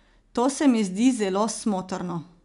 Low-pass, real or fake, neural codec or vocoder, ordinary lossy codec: 10.8 kHz; real; none; none